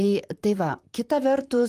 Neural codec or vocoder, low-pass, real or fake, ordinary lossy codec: vocoder, 44.1 kHz, 128 mel bands, Pupu-Vocoder; 14.4 kHz; fake; Opus, 32 kbps